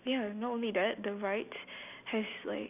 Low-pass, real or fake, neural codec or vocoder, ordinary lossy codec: 3.6 kHz; real; none; none